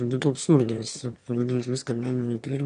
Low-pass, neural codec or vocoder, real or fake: 9.9 kHz; autoencoder, 22.05 kHz, a latent of 192 numbers a frame, VITS, trained on one speaker; fake